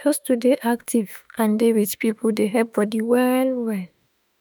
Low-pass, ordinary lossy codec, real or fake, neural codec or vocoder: none; none; fake; autoencoder, 48 kHz, 32 numbers a frame, DAC-VAE, trained on Japanese speech